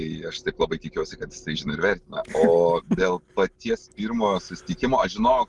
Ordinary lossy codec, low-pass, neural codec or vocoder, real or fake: Opus, 24 kbps; 10.8 kHz; none; real